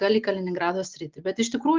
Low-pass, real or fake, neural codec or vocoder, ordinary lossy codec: 7.2 kHz; real; none; Opus, 32 kbps